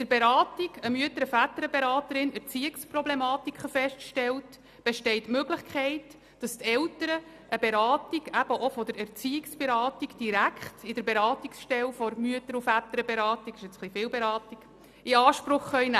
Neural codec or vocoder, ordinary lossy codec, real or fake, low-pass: none; none; real; 14.4 kHz